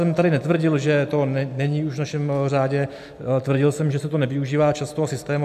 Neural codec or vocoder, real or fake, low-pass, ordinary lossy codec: none; real; 14.4 kHz; AAC, 96 kbps